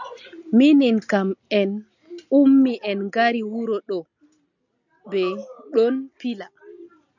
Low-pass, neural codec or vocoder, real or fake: 7.2 kHz; none; real